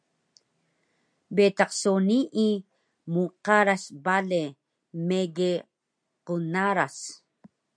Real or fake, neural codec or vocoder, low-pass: real; none; 9.9 kHz